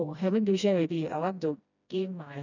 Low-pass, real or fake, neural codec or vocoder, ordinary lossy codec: 7.2 kHz; fake; codec, 16 kHz, 1 kbps, FreqCodec, smaller model; none